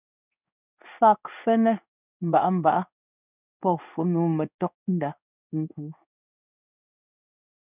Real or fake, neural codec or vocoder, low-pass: fake; codec, 16 kHz in and 24 kHz out, 1 kbps, XY-Tokenizer; 3.6 kHz